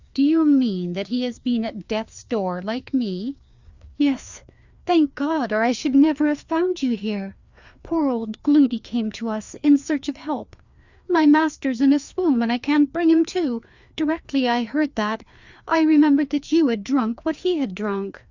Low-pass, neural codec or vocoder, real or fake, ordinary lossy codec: 7.2 kHz; codec, 16 kHz, 2 kbps, FreqCodec, larger model; fake; Opus, 64 kbps